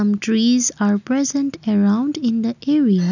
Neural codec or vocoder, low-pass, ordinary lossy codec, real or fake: none; 7.2 kHz; none; real